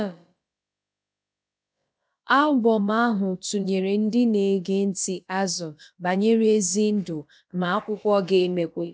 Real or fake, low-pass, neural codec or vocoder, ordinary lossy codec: fake; none; codec, 16 kHz, about 1 kbps, DyCAST, with the encoder's durations; none